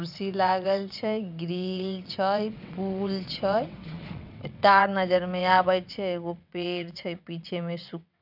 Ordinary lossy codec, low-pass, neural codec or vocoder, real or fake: none; 5.4 kHz; vocoder, 22.05 kHz, 80 mel bands, WaveNeXt; fake